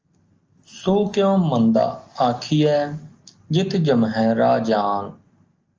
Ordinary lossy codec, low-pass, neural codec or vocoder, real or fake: Opus, 24 kbps; 7.2 kHz; none; real